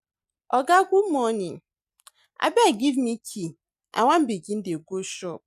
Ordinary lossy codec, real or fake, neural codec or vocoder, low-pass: none; real; none; 14.4 kHz